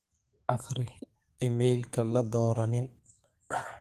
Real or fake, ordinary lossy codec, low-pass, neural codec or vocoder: fake; Opus, 24 kbps; 14.4 kHz; codec, 32 kHz, 1.9 kbps, SNAC